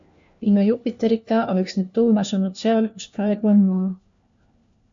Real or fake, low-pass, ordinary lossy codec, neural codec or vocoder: fake; 7.2 kHz; MP3, 64 kbps; codec, 16 kHz, 1 kbps, FunCodec, trained on LibriTTS, 50 frames a second